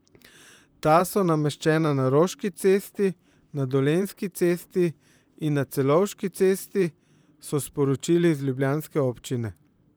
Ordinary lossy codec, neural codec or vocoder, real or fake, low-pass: none; vocoder, 44.1 kHz, 128 mel bands, Pupu-Vocoder; fake; none